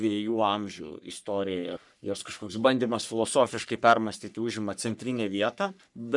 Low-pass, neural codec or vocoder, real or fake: 10.8 kHz; codec, 44.1 kHz, 3.4 kbps, Pupu-Codec; fake